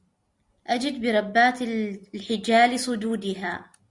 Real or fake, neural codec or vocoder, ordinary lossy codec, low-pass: real; none; Opus, 64 kbps; 10.8 kHz